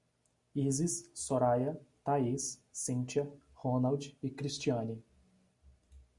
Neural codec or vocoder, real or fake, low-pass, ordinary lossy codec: none; real; 10.8 kHz; Opus, 64 kbps